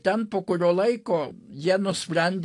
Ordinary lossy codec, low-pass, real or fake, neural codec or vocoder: AAC, 48 kbps; 10.8 kHz; real; none